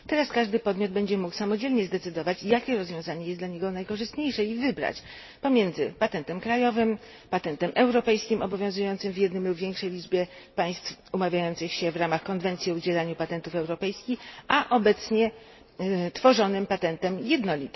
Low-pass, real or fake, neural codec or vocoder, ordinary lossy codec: 7.2 kHz; real; none; MP3, 24 kbps